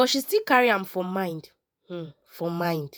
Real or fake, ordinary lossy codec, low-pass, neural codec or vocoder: fake; none; none; vocoder, 48 kHz, 128 mel bands, Vocos